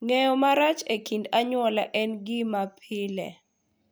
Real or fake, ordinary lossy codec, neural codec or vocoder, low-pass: real; none; none; none